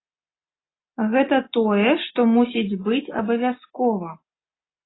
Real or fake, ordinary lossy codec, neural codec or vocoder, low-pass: real; AAC, 16 kbps; none; 7.2 kHz